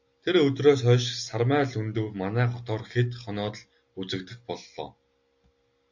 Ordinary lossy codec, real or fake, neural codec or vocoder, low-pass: AAC, 48 kbps; real; none; 7.2 kHz